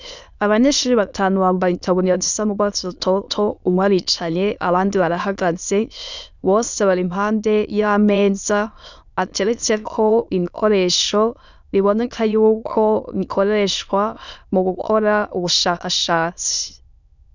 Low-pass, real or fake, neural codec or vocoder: 7.2 kHz; fake; autoencoder, 22.05 kHz, a latent of 192 numbers a frame, VITS, trained on many speakers